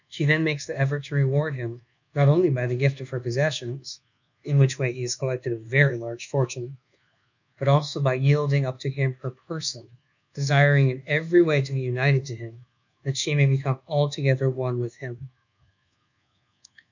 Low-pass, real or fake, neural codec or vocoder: 7.2 kHz; fake; codec, 24 kHz, 1.2 kbps, DualCodec